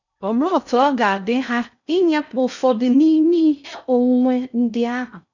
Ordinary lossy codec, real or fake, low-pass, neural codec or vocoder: none; fake; 7.2 kHz; codec, 16 kHz in and 24 kHz out, 0.6 kbps, FocalCodec, streaming, 2048 codes